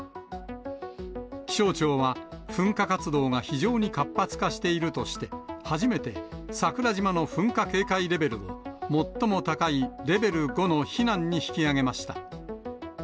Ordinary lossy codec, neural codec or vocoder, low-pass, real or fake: none; none; none; real